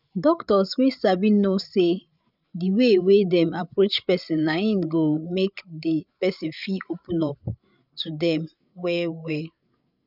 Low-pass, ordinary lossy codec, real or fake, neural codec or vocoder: 5.4 kHz; none; fake; codec, 16 kHz, 8 kbps, FreqCodec, larger model